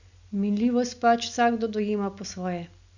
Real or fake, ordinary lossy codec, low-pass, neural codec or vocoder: real; none; 7.2 kHz; none